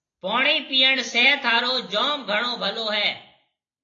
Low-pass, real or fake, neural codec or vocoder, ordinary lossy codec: 7.2 kHz; real; none; AAC, 32 kbps